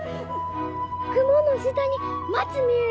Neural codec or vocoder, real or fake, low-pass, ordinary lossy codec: none; real; none; none